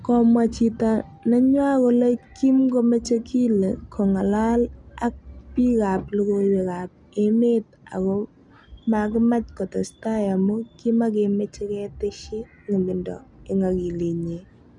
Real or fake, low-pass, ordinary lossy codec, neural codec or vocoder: real; 10.8 kHz; none; none